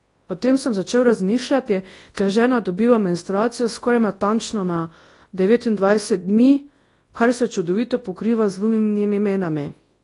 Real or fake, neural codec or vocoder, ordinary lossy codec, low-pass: fake; codec, 24 kHz, 0.9 kbps, WavTokenizer, large speech release; AAC, 32 kbps; 10.8 kHz